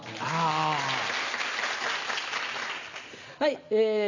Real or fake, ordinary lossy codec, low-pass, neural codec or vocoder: real; none; 7.2 kHz; none